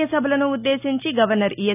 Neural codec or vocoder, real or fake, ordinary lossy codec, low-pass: none; real; none; 3.6 kHz